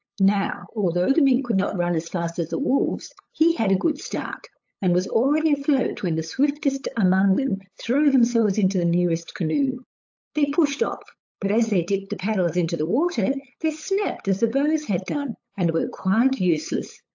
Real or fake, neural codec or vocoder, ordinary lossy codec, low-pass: fake; codec, 16 kHz, 8 kbps, FunCodec, trained on LibriTTS, 25 frames a second; AAC, 48 kbps; 7.2 kHz